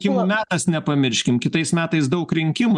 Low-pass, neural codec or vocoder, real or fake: 10.8 kHz; none; real